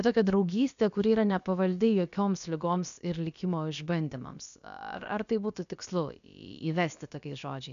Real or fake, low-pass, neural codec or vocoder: fake; 7.2 kHz; codec, 16 kHz, about 1 kbps, DyCAST, with the encoder's durations